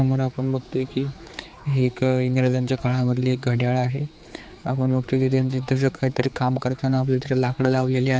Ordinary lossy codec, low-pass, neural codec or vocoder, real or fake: none; none; codec, 16 kHz, 4 kbps, X-Codec, HuBERT features, trained on general audio; fake